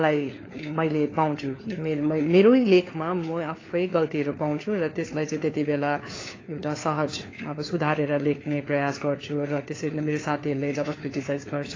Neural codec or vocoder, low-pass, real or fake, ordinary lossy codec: codec, 16 kHz, 4 kbps, FunCodec, trained on LibriTTS, 50 frames a second; 7.2 kHz; fake; AAC, 32 kbps